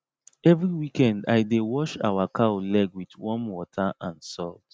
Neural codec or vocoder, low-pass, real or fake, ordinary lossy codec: none; none; real; none